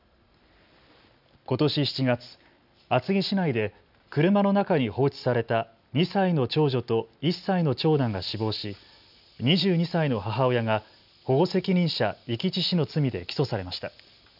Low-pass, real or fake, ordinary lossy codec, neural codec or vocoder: 5.4 kHz; real; none; none